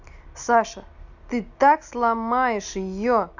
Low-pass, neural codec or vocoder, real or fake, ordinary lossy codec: 7.2 kHz; none; real; none